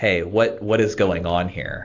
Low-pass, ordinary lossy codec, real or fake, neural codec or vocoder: 7.2 kHz; AAC, 48 kbps; real; none